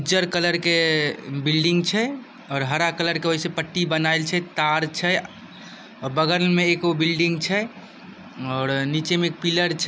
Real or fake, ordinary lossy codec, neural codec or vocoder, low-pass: real; none; none; none